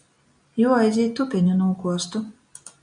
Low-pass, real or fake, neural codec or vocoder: 9.9 kHz; real; none